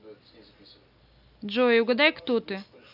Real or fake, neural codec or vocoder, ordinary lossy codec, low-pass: real; none; none; 5.4 kHz